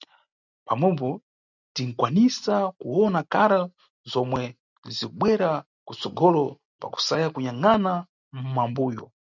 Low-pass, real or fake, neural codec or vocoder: 7.2 kHz; real; none